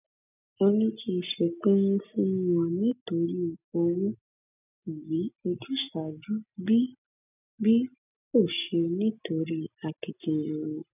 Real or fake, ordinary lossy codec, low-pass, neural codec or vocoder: real; none; 3.6 kHz; none